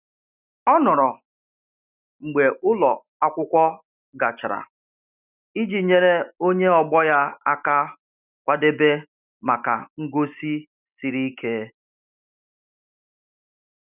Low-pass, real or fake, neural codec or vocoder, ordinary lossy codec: 3.6 kHz; real; none; none